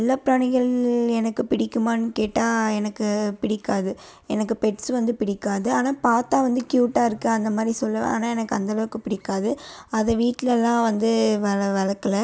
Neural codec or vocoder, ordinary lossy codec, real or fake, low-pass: none; none; real; none